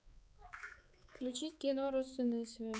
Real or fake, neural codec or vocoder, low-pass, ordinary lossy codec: fake; codec, 16 kHz, 4 kbps, X-Codec, HuBERT features, trained on balanced general audio; none; none